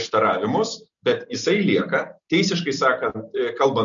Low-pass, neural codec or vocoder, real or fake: 7.2 kHz; none; real